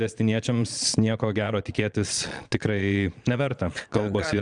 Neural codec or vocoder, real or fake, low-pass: vocoder, 22.05 kHz, 80 mel bands, Vocos; fake; 9.9 kHz